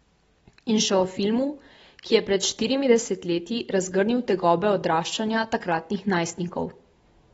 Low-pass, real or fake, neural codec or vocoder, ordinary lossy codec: 14.4 kHz; real; none; AAC, 24 kbps